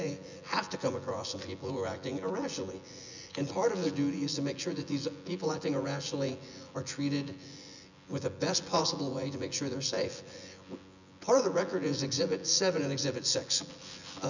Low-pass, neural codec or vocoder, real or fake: 7.2 kHz; vocoder, 24 kHz, 100 mel bands, Vocos; fake